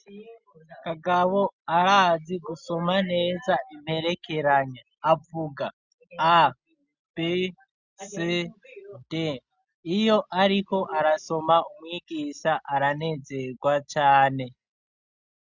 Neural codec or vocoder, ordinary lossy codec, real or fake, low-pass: none; Opus, 64 kbps; real; 7.2 kHz